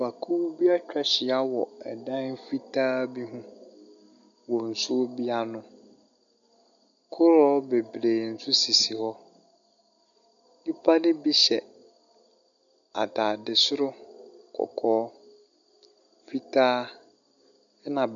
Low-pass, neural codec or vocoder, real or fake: 7.2 kHz; none; real